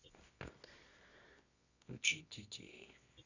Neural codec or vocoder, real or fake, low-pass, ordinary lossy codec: codec, 24 kHz, 0.9 kbps, WavTokenizer, medium music audio release; fake; 7.2 kHz; none